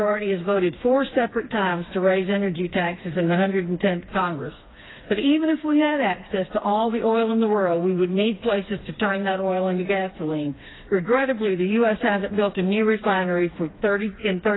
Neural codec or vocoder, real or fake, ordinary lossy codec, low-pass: codec, 16 kHz, 2 kbps, FreqCodec, smaller model; fake; AAC, 16 kbps; 7.2 kHz